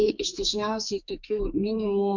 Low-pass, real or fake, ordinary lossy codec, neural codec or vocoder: 7.2 kHz; fake; MP3, 64 kbps; codec, 44.1 kHz, 2.6 kbps, SNAC